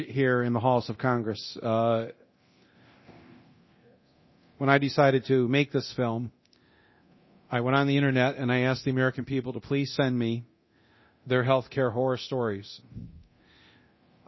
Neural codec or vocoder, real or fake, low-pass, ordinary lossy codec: codec, 24 kHz, 0.9 kbps, DualCodec; fake; 7.2 kHz; MP3, 24 kbps